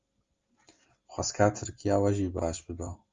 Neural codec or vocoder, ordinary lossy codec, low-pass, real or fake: none; Opus, 32 kbps; 7.2 kHz; real